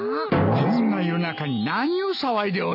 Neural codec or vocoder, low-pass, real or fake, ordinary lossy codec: none; 5.4 kHz; real; none